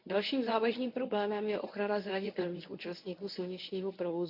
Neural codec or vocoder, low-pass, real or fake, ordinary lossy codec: codec, 24 kHz, 0.9 kbps, WavTokenizer, medium speech release version 1; 5.4 kHz; fake; none